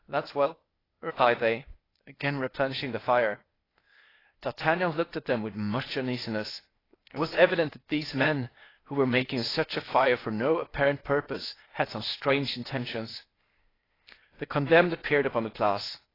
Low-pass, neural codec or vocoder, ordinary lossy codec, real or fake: 5.4 kHz; codec, 16 kHz, 0.8 kbps, ZipCodec; AAC, 24 kbps; fake